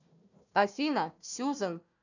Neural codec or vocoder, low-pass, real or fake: codec, 16 kHz, 1 kbps, FunCodec, trained on Chinese and English, 50 frames a second; 7.2 kHz; fake